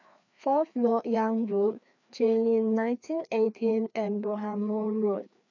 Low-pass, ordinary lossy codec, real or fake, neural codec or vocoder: 7.2 kHz; none; fake; codec, 16 kHz, 2 kbps, FreqCodec, larger model